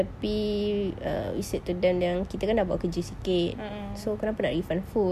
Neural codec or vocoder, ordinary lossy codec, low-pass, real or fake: none; none; 14.4 kHz; real